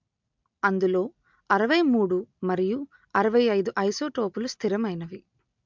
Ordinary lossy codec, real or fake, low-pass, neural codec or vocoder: MP3, 64 kbps; real; 7.2 kHz; none